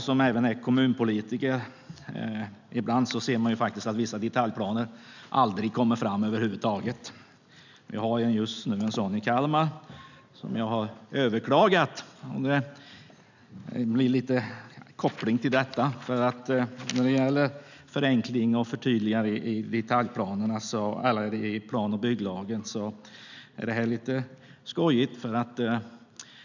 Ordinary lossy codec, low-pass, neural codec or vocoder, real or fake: none; 7.2 kHz; none; real